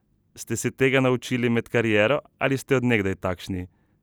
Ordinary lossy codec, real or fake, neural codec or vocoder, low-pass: none; real; none; none